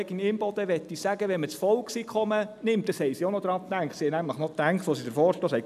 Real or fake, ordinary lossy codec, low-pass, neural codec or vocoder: real; none; 14.4 kHz; none